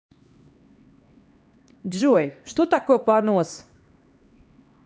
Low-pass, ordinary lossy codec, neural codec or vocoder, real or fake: none; none; codec, 16 kHz, 2 kbps, X-Codec, HuBERT features, trained on LibriSpeech; fake